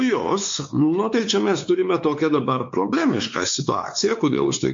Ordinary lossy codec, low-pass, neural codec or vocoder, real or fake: MP3, 48 kbps; 7.2 kHz; codec, 16 kHz, 4 kbps, X-Codec, WavLM features, trained on Multilingual LibriSpeech; fake